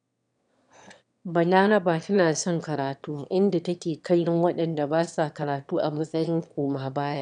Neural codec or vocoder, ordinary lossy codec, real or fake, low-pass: autoencoder, 22.05 kHz, a latent of 192 numbers a frame, VITS, trained on one speaker; none; fake; 9.9 kHz